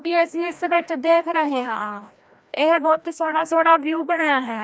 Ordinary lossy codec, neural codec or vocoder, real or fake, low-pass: none; codec, 16 kHz, 1 kbps, FreqCodec, larger model; fake; none